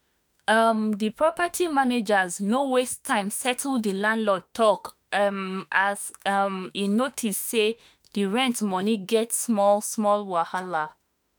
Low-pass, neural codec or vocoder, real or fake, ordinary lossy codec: none; autoencoder, 48 kHz, 32 numbers a frame, DAC-VAE, trained on Japanese speech; fake; none